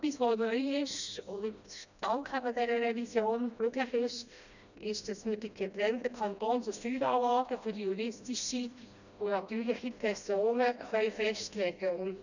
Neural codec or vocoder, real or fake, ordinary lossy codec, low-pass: codec, 16 kHz, 1 kbps, FreqCodec, smaller model; fake; none; 7.2 kHz